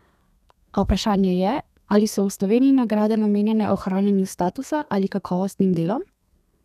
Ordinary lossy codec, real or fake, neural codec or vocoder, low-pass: none; fake; codec, 32 kHz, 1.9 kbps, SNAC; 14.4 kHz